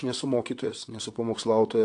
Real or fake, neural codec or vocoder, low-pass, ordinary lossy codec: fake; vocoder, 22.05 kHz, 80 mel bands, WaveNeXt; 9.9 kHz; AAC, 48 kbps